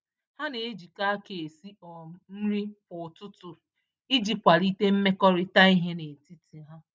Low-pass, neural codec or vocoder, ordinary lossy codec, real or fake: none; none; none; real